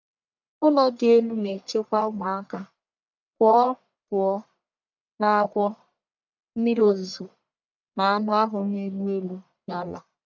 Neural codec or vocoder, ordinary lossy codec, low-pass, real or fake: codec, 44.1 kHz, 1.7 kbps, Pupu-Codec; none; 7.2 kHz; fake